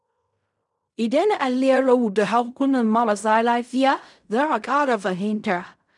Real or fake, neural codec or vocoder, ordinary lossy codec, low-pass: fake; codec, 16 kHz in and 24 kHz out, 0.4 kbps, LongCat-Audio-Codec, fine tuned four codebook decoder; none; 10.8 kHz